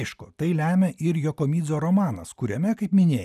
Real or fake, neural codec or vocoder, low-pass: real; none; 14.4 kHz